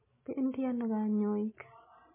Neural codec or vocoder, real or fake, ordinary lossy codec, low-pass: none; real; MP3, 16 kbps; 3.6 kHz